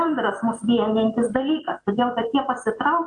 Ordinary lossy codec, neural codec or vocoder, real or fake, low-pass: AAC, 64 kbps; vocoder, 44.1 kHz, 128 mel bands every 256 samples, BigVGAN v2; fake; 10.8 kHz